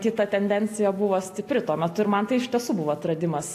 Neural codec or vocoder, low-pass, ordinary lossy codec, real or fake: none; 14.4 kHz; AAC, 64 kbps; real